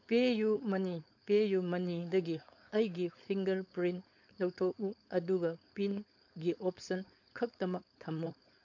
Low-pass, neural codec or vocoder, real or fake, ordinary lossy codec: 7.2 kHz; codec, 16 kHz, 4.8 kbps, FACodec; fake; MP3, 64 kbps